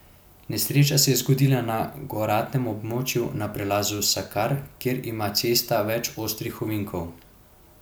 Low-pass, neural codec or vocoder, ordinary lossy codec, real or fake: none; none; none; real